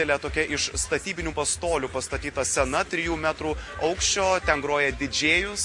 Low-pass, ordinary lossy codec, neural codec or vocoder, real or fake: 10.8 kHz; MP3, 48 kbps; none; real